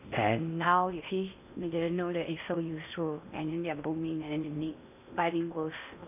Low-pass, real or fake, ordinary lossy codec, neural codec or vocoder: 3.6 kHz; fake; none; codec, 16 kHz in and 24 kHz out, 0.8 kbps, FocalCodec, streaming, 65536 codes